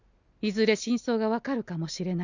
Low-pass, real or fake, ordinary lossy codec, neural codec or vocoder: 7.2 kHz; real; none; none